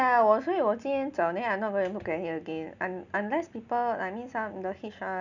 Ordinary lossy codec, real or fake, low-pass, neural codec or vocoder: none; real; 7.2 kHz; none